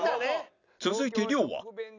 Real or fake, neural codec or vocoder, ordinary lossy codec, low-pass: real; none; none; 7.2 kHz